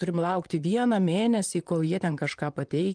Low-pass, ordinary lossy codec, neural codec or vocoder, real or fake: 9.9 kHz; Opus, 32 kbps; vocoder, 44.1 kHz, 128 mel bands, Pupu-Vocoder; fake